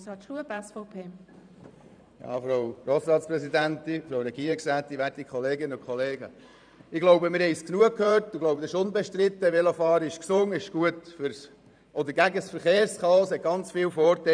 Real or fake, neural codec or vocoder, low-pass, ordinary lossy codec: fake; vocoder, 44.1 kHz, 128 mel bands every 512 samples, BigVGAN v2; 9.9 kHz; none